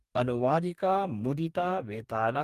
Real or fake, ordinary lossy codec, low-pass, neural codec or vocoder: fake; Opus, 32 kbps; 14.4 kHz; codec, 44.1 kHz, 2.6 kbps, DAC